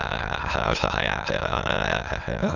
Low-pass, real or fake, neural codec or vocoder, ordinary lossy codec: 7.2 kHz; fake; autoencoder, 22.05 kHz, a latent of 192 numbers a frame, VITS, trained on many speakers; none